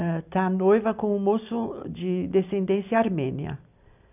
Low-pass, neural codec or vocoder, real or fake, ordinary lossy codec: 3.6 kHz; none; real; none